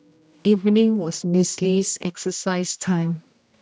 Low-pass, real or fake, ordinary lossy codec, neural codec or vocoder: none; fake; none; codec, 16 kHz, 1 kbps, X-Codec, HuBERT features, trained on general audio